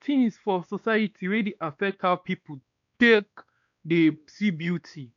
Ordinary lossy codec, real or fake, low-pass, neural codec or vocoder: none; fake; 7.2 kHz; codec, 16 kHz, 2 kbps, X-Codec, WavLM features, trained on Multilingual LibriSpeech